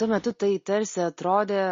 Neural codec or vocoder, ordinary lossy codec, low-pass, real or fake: none; MP3, 32 kbps; 7.2 kHz; real